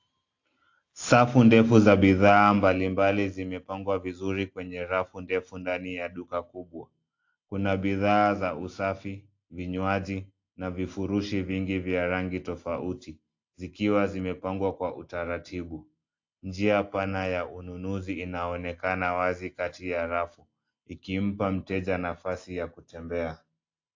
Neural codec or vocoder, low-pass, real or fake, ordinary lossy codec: none; 7.2 kHz; real; AAC, 48 kbps